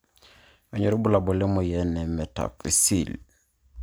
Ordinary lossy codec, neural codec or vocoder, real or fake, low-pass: none; none; real; none